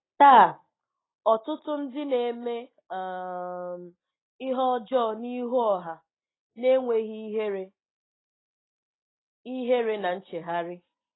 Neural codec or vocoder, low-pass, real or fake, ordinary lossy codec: none; 7.2 kHz; real; AAC, 16 kbps